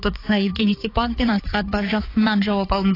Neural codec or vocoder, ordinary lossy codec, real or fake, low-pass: codec, 16 kHz, 4 kbps, X-Codec, HuBERT features, trained on balanced general audio; AAC, 24 kbps; fake; 5.4 kHz